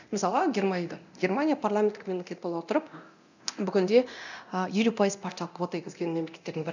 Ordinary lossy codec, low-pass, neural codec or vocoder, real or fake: none; 7.2 kHz; codec, 24 kHz, 0.9 kbps, DualCodec; fake